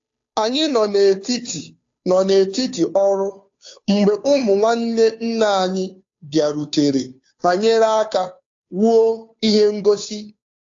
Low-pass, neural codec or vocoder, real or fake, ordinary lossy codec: 7.2 kHz; codec, 16 kHz, 2 kbps, FunCodec, trained on Chinese and English, 25 frames a second; fake; AAC, 48 kbps